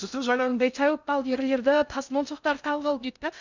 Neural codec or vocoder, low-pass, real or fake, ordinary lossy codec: codec, 16 kHz in and 24 kHz out, 0.6 kbps, FocalCodec, streaming, 2048 codes; 7.2 kHz; fake; none